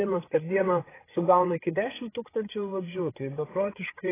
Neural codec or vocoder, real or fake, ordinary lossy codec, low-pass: codec, 16 kHz, 8 kbps, FreqCodec, larger model; fake; AAC, 16 kbps; 3.6 kHz